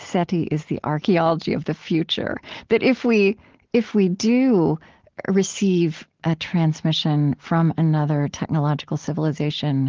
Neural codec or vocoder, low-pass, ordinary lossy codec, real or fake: none; 7.2 kHz; Opus, 16 kbps; real